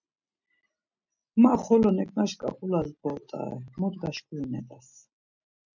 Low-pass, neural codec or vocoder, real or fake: 7.2 kHz; none; real